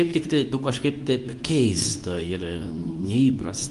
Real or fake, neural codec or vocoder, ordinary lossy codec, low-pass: fake; codec, 24 kHz, 0.9 kbps, WavTokenizer, medium speech release version 2; Opus, 32 kbps; 10.8 kHz